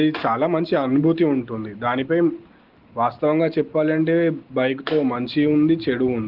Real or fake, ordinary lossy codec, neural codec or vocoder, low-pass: real; Opus, 16 kbps; none; 5.4 kHz